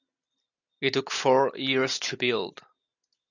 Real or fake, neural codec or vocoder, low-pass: real; none; 7.2 kHz